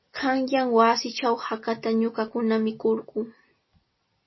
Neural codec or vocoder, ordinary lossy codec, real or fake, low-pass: none; MP3, 24 kbps; real; 7.2 kHz